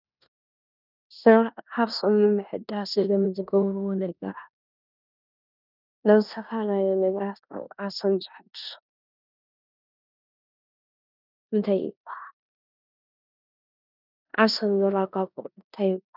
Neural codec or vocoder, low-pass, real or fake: codec, 16 kHz in and 24 kHz out, 0.9 kbps, LongCat-Audio-Codec, four codebook decoder; 5.4 kHz; fake